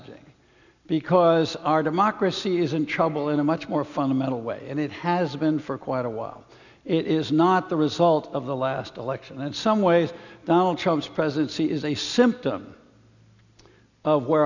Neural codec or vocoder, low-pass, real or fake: none; 7.2 kHz; real